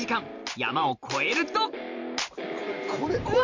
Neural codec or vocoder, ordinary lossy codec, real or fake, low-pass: vocoder, 44.1 kHz, 128 mel bands every 512 samples, BigVGAN v2; MP3, 64 kbps; fake; 7.2 kHz